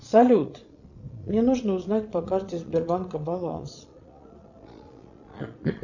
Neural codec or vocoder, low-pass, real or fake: vocoder, 22.05 kHz, 80 mel bands, Vocos; 7.2 kHz; fake